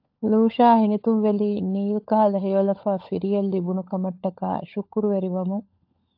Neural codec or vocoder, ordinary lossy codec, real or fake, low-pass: codec, 16 kHz, 16 kbps, FunCodec, trained on LibriTTS, 50 frames a second; AAC, 48 kbps; fake; 5.4 kHz